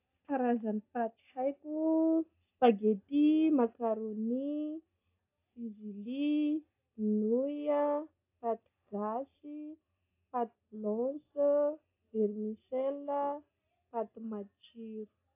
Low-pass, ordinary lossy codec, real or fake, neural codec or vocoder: 3.6 kHz; AAC, 32 kbps; real; none